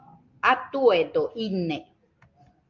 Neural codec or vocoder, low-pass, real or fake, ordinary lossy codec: none; 7.2 kHz; real; Opus, 24 kbps